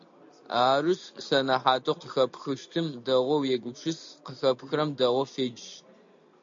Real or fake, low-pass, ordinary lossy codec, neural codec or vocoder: real; 7.2 kHz; AAC, 64 kbps; none